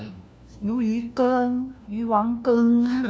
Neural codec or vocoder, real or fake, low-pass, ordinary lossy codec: codec, 16 kHz, 1 kbps, FunCodec, trained on LibriTTS, 50 frames a second; fake; none; none